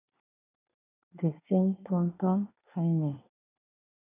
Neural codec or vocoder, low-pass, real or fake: codec, 24 kHz, 1 kbps, SNAC; 3.6 kHz; fake